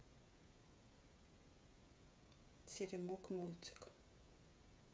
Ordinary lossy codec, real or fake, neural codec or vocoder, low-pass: none; fake; codec, 16 kHz, 16 kbps, FreqCodec, smaller model; none